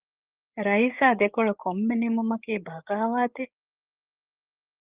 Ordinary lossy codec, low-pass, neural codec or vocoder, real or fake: Opus, 32 kbps; 3.6 kHz; codec, 16 kHz, 8 kbps, FreqCodec, larger model; fake